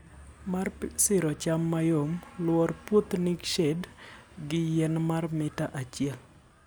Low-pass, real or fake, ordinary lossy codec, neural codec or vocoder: none; real; none; none